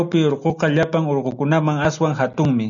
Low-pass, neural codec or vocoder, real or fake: 7.2 kHz; none; real